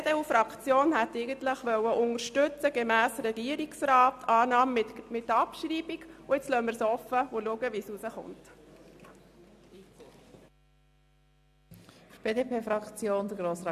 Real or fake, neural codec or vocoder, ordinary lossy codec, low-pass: real; none; AAC, 96 kbps; 14.4 kHz